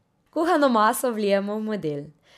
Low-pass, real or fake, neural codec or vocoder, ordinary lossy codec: 14.4 kHz; real; none; MP3, 96 kbps